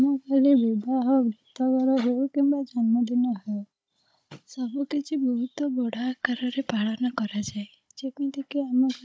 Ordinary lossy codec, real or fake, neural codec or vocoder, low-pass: none; fake; codec, 16 kHz, 16 kbps, FunCodec, trained on Chinese and English, 50 frames a second; none